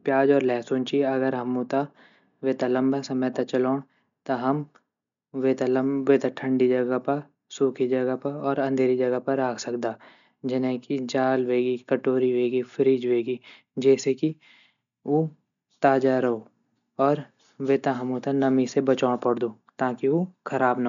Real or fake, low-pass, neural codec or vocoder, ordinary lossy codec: real; 7.2 kHz; none; none